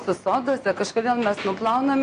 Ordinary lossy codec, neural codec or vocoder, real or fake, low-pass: AAC, 48 kbps; none; real; 9.9 kHz